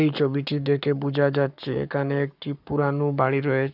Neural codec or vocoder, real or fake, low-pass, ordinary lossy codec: codec, 44.1 kHz, 7.8 kbps, Pupu-Codec; fake; 5.4 kHz; none